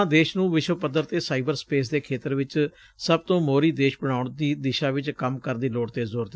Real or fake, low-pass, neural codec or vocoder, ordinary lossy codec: real; none; none; none